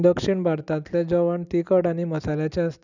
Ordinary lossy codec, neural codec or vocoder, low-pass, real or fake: none; none; 7.2 kHz; real